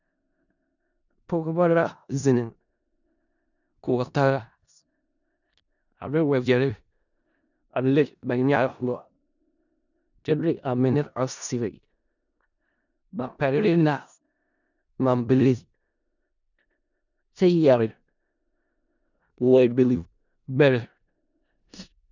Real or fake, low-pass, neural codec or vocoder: fake; 7.2 kHz; codec, 16 kHz in and 24 kHz out, 0.4 kbps, LongCat-Audio-Codec, four codebook decoder